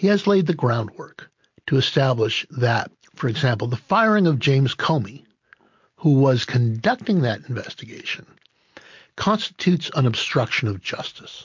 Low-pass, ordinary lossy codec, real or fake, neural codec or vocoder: 7.2 kHz; MP3, 48 kbps; real; none